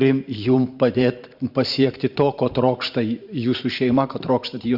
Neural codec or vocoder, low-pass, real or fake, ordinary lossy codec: vocoder, 44.1 kHz, 128 mel bands every 512 samples, BigVGAN v2; 5.4 kHz; fake; Opus, 64 kbps